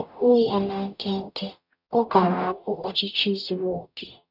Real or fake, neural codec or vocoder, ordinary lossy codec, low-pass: fake; codec, 44.1 kHz, 0.9 kbps, DAC; none; 5.4 kHz